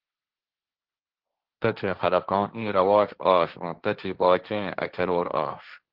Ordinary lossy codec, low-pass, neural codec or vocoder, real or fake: Opus, 16 kbps; 5.4 kHz; codec, 16 kHz, 1.1 kbps, Voila-Tokenizer; fake